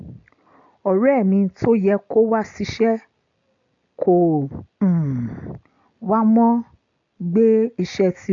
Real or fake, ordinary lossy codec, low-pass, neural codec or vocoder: real; AAC, 64 kbps; 7.2 kHz; none